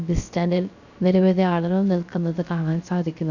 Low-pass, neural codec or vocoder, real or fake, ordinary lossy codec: 7.2 kHz; codec, 16 kHz, 0.3 kbps, FocalCodec; fake; none